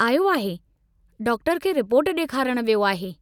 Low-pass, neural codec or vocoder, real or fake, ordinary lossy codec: 19.8 kHz; none; real; none